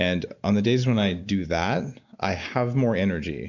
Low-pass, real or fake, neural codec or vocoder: 7.2 kHz; real; none